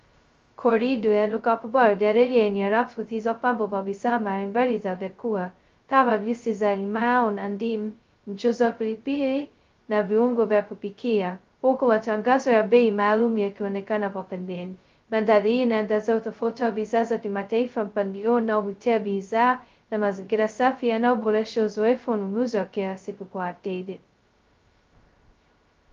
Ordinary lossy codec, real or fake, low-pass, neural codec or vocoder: Opus, 32 kbps; fake; 7.2 kHz; codec, 16 kHz, 0.2 kbps, FocalCodec